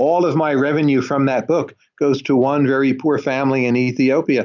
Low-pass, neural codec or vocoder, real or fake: 7.2 kHz; none; real